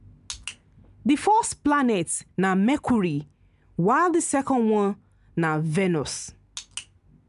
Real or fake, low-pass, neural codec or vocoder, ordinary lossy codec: real; 10.8 kHz; none; none